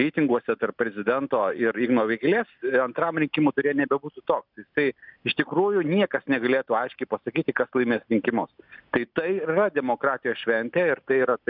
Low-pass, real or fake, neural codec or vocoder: 5.4 kHz; real; none